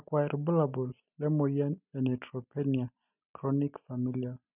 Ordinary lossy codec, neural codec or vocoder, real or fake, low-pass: none; none; real; 3.6 kHz